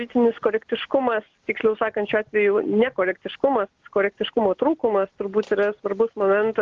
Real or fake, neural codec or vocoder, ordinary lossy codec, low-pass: real; none; Opus, 16 kbps; 7.2 kHz